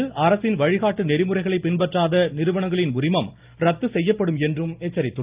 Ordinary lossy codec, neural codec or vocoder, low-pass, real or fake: Opus, 32 kbps; none; 3.6 kHz; real